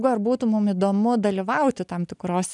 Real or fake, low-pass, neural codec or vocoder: real; 10.8 kHz; none